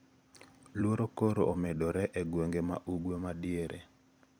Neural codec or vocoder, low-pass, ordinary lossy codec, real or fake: vocoder, 44.1 kHz, 128 mel bands every 256 samples, BigVGAN v2; none; none; fake